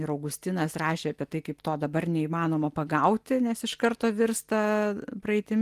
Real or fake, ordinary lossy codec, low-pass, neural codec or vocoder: real; Opus, 24 kbps; 14.4 kHz; none